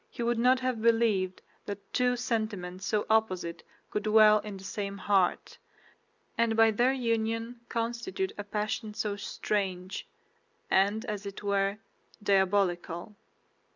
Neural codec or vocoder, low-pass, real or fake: none; 7.2 kHz; real